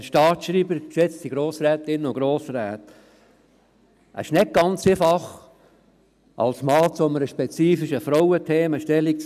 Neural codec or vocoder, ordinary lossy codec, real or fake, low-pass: none; none; real; 14.4 kHz